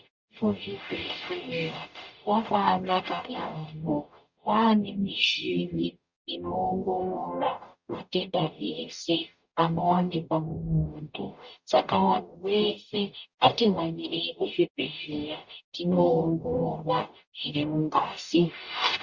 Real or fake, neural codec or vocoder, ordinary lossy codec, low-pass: fake; codec, 44.1 kHz, 0.9 kbps, DAC; Opus, 64 kbps; 7.2 kHz